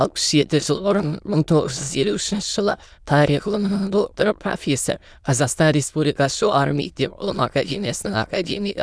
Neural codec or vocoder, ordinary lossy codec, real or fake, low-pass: autoencoder, 22.05 kHz, a latent of 192 numbers a frame, VITS, trained on many speakers; none; fake; none